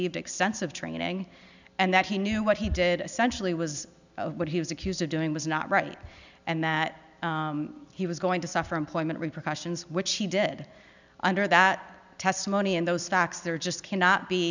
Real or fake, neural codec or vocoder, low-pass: real; none; 7.2 kHz